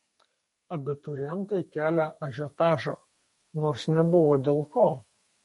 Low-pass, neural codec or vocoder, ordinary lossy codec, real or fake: 14.4 kHz; codec, 32 kHz, 1.9 kbps, SNAC; MP3, 48 kbps; fake